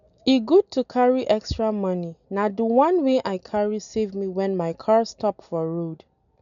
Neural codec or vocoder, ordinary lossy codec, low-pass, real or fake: none; none; 7.2 kHz; real